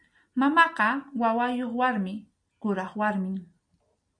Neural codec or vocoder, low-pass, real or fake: none; 9.9 kHz; real